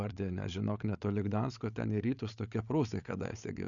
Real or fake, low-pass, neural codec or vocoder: fake; 7.2 kHz; codec, 16 kHz, 8 kbps, FreqCodec, larger model